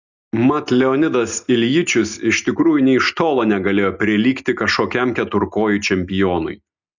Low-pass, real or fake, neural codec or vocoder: 7.2 kHz; real; none